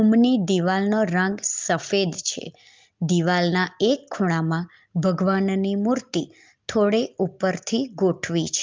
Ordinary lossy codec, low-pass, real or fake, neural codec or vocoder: Opus, 32 kbps; 7.2 kHz; real; none